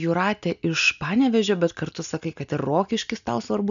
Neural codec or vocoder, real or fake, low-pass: none; real; 7.2 kHz